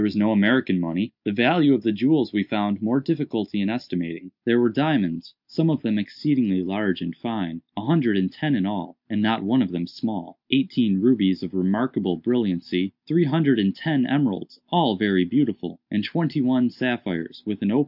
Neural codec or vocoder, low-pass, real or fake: none; 5.4 kHz; real